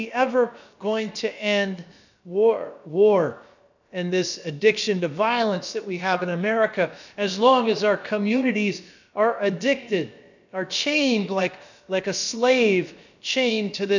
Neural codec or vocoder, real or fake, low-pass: codec, 16 kHz, about 1 kbps, DyCAST, with the encoder's durations; fake; 7.2 kHz